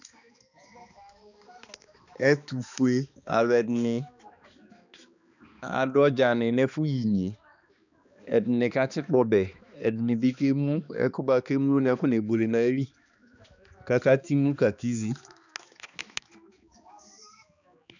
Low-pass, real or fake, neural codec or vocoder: 7.2 kHz; fake; codec, 16 kHz, 2 kbps, X-Codec, HuBERT features, trained on balanced general audio